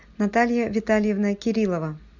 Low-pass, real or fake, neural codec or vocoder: 7.2 kHz; real; none